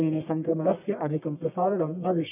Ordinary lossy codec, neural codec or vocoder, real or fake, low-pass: none; codec, 44.1 kHz, 1.7 kbps, Pupu-Codec; fake; 3.6 kHz